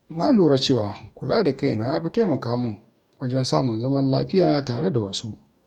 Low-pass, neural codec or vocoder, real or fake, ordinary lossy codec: 19.8 kHz; codec, 44.1 kHz, 2.6 kbps, DAC; fake; none